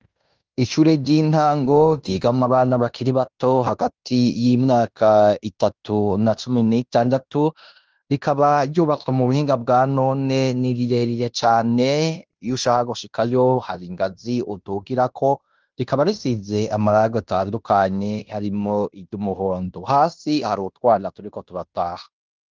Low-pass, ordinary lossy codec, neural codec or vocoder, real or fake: 7.2 kHz; Opus, 24 kbps; codec, 16 kHz in and 24 kHz out, 0.9 kbps, LongCat-Audio-Codec, fine tuned four codebook decoder; fake